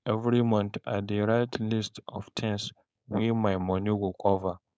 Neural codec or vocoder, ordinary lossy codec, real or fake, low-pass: codec, 16 kHz, 4.8 kbps, FACodec; none; fake; none